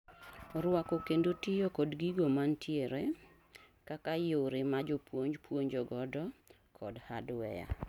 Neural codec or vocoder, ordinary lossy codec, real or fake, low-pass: none; none; real; 19.8 kHz